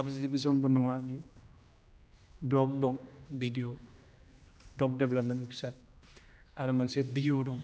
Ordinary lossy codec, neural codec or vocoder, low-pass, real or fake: none; codec, 16 kHz, 1 kbps, X-Codec, HuBERT features, trained on general audio; none; fake